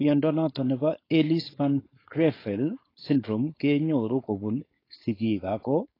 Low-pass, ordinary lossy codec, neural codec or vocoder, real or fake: 5.4 kHz; AAC, 24 kbps; codec, 16 kHz, 4.8 kbps, FACodec; fake